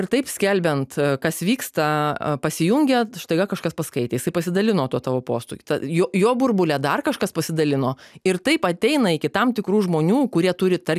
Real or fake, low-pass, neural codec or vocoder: real; 14.4 kHz; none